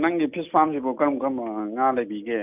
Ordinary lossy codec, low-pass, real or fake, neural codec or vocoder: none; 3.6 kHz; real; none